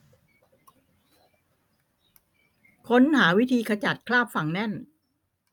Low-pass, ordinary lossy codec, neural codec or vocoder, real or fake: 19.8 kHz; none; vocoder, 44.1 kHz, 128 mel bands every 256 samples, BigVGAN v2; fake